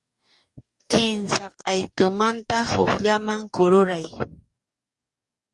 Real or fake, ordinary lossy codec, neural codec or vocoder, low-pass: fake; Opus, 64 kbps; codec, 44.1 kHz, 2.6 kbps, DAC; 10.8 kHz